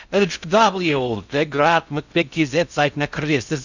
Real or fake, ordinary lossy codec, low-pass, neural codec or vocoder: fake; none; 7.2 kHz; codec, 16 kHz in and 24 kHz out, 0.6 kbps, FocalCodec, streaming, 2048 codes